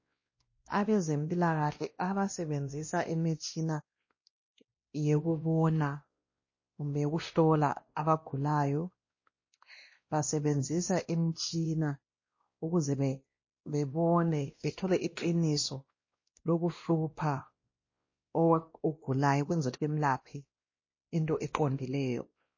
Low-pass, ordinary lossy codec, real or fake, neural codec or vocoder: 7.2 kHz; MP3, 32 kbps; fake; codec, 16 kHz, 1 kbps, X-Codec, WavLM features, trained on Multilingual LibriSpeech